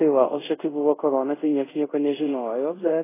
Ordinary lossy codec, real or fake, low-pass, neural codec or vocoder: AAC, 16 kbps; fake; 3.6 kHz; codec, 24 kHz, 0.5 kbps, DualCodec